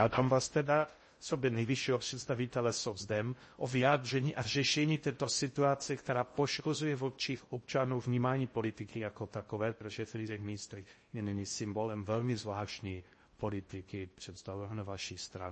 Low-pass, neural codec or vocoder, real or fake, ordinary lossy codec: 10.8 kHz; codec, 16 kHz in and 24 kHz out, 0.6 kbps, FocalCodec, streaming, 4096 codes; fake; MP3, 32 kbps